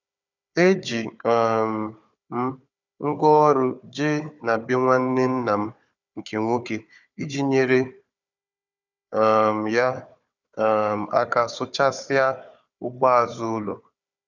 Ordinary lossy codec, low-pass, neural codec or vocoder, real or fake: none; 7.2 kHz; codec, 16 kHz, 4 kbps, FunCodec, trained on Chinese and English, 50 frames a second; fake